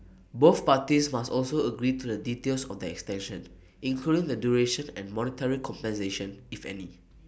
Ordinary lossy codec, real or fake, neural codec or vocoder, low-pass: none; real; none; none